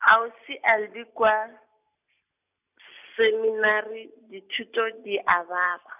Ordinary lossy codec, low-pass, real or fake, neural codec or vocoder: none; 3.6 kHz; real; none